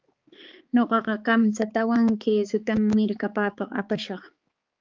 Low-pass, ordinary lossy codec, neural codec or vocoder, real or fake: 7.2 kHz; Opus, 32 kbps; codec, 16 kHz, 4 kbps, X-Codec, HuBERT features, trained on balanced general audio; fake